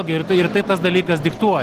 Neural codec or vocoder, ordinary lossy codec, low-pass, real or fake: none; Opus, 16 kbps; 14.4 kHz; real